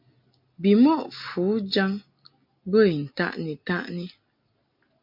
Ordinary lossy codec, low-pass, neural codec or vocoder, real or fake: MP3, 48 kbps; 5.4 kHz; none; real